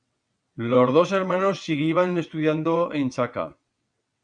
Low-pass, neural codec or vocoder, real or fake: 9.9 kHz; vocoder, 22.05 kHz, 80 mel bands, WaveNeXt; fake